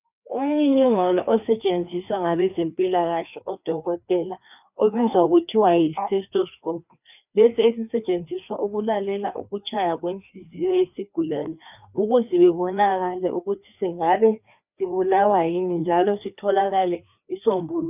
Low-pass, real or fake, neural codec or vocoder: 3.6 kHz; fake; codec, 16 kHz, 2 kbps, FreqCodec, larger model